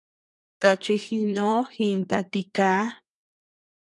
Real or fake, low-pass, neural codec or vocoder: fake; 10.8 kHz; codec, 24 kHz, 1 kbps, SNAC